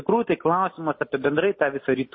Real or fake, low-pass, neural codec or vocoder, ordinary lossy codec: real; 7.2 kHz; none; MP3, 24 kbps